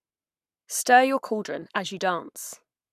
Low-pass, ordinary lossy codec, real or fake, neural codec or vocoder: 14.4 kHz; none; fake; codec, 44.1 kHz, 7.8 kbps, Pupu-Codec